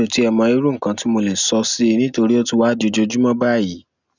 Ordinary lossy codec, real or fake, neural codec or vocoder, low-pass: none; real; none; 7.2 kHz